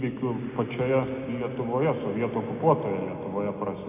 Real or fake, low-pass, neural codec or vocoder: real; 3.6 kHz; none